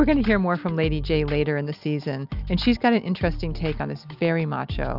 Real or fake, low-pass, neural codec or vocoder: real; 5.4 kHz; none